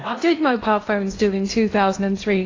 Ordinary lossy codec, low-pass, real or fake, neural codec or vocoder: AAC, 32 kbps; 7.2 kHz; fake; codec, 16 kHz in and 24 kHz out, 0.8 kbps, FocalCodec, streaming, 65536 codes